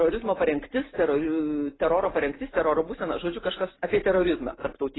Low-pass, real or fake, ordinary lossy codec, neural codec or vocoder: 7.2 kHz; real; AAC, 16 kbps; none